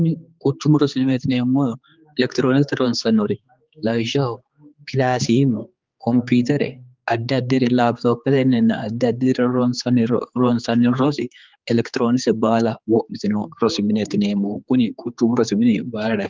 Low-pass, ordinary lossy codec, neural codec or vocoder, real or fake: 7.2 kHz; Opus, 24 kbps; codec, 16 kHz, 4 kbps, X-Codec, HuBERT features, trained on general audio; fake